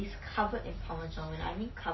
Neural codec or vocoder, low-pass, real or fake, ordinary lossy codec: none; 7.2 kHz; real; MP3, 24 kbps